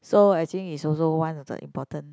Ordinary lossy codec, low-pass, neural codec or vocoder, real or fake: none; none; none; real